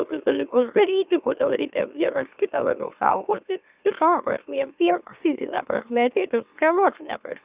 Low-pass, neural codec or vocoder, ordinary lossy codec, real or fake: 3.6 kHz; autoencoder, 44.1 kHz, a latent of 192 numbers a frame, MeloTTS; Opus, 64 kbps; fake